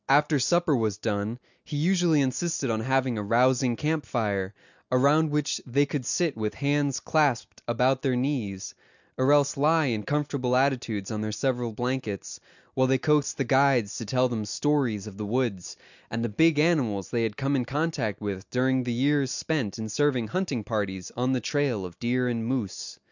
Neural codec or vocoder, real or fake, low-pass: none; real; 7.2 kHz